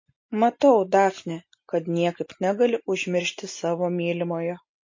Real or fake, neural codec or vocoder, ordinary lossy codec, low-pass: real; none; MP3, 32 kbps; 7.2 kHz